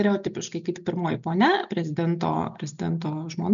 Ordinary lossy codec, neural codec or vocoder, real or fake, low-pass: MP3, 96 kbps; none; real; 7.2 kHz